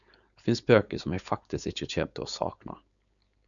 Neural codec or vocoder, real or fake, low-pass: codec, 16 kHz, 4.8 kbps, FACodec; fake; 7.2 kHz